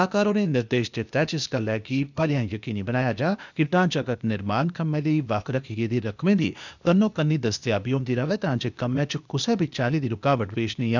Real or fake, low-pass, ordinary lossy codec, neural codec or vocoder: fake; 7.2 kHz; none; codec, 16 kHz, 0.8 kbps, ZipCodec